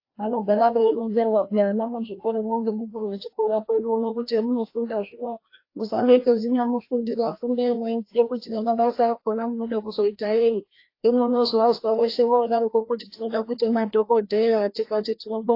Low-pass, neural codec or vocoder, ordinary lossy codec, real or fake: 5.4 kHz; codec, 16 kHz, 1 kbps, FreqCodec, larger model; AAC, 32 kbps; fake